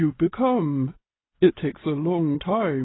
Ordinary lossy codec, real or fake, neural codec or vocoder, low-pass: AAC, 16 kbps; real; none; 7.2 kHz